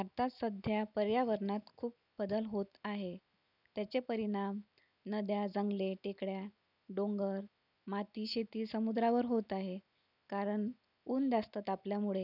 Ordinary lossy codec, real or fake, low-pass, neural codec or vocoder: none; real; 5.4 kHz; none